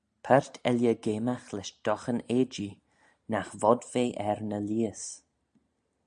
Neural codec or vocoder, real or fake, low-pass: none; real; 9.9 kHz